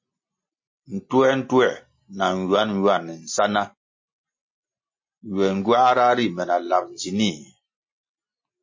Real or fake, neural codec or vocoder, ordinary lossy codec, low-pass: real; none; MP3, 32 kbps; 7.2 kHz